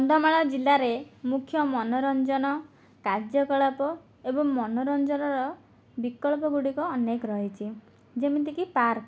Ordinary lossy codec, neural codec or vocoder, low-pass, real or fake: none; none; none; real